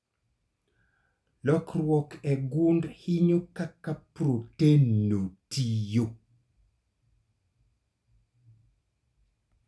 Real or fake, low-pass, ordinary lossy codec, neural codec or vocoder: real; none; none; none